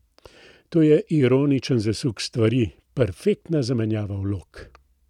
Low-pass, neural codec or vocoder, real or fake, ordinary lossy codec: 19.8 kHz; none; real; none